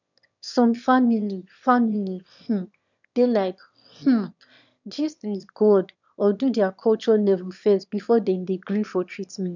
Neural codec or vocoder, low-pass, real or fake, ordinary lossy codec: autoencoder, 22.05 kHz, a latent of 192 numbers a frame, VITS, trained on one speaker; 7.2 kHz; fake; none